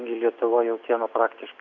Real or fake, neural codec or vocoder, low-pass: real; none; 7.2 kHz